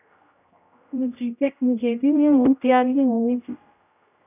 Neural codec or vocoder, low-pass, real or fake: codec, 16 kHz, 0.5 kbps, X-Codec, HuBERT features, trained on general audio; 3.6 kHz; fake